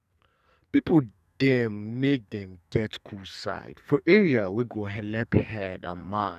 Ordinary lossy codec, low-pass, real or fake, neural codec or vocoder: none; 14.4 kHz; fake; codec, 44.1 kHz, 2.6 kbps, SNAC